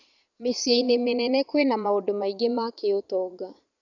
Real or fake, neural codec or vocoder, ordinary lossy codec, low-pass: fake; vocoder, 22.05 kHz, 80 mel bands, Vocos; none; 7.2 kHz